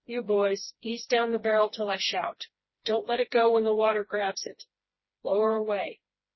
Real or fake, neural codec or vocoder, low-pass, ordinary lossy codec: fake; codec, 16 kHz, 2 kbps, FreqCodec, smaller model; 7.2 kHz; MP3, 24 kbps